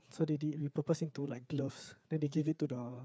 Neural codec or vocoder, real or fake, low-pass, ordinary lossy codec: codec, 16 kHz, 4 kbps, FreqCodec, larger model; fake; none; none